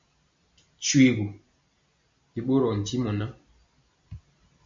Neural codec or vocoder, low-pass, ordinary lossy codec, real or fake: none; 7.2 kHz; MP3, 48 kbps; real